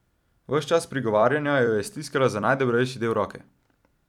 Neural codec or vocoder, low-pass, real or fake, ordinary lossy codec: vocoder, 44.1 kHz, 128 mel bands every 256 samples, BigVGAN v2; 19.8 kHz; fake; none